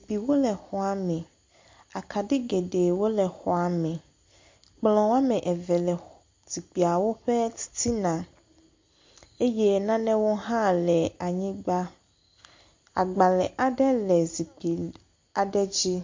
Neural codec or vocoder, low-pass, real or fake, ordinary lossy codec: none; 7.2 kHz; real; MP3, 48 kbps